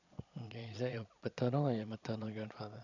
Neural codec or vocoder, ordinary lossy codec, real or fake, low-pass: vocoder, 22.05 kHz, 80 mel bands, Vocos; none; fake; 7.2 kHz